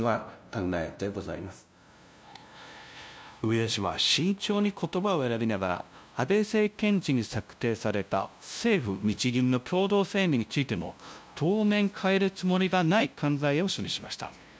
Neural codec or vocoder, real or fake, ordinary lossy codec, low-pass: codec, 16 kHz, 0.5 kbps, FunCodec, trained on LibriTTS, 25 frames a second; fake; none; none